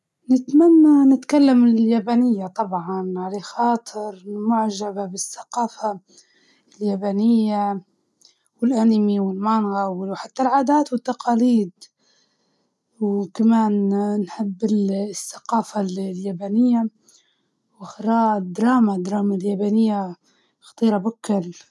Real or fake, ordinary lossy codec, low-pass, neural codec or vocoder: real; none; none; none